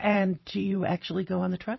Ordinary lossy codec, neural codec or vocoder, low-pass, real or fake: MP3, 24 kbps; vocoder, 44.1 kHz, 128 mel bands every 512 samples, BigVGAN v2; 7.2 kHz; fake